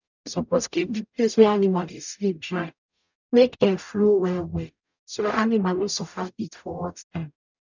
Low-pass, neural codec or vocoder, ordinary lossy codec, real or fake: 7.2 kHz; codec, 44.1 kHz, 0.9 kbps, DAC; none; fake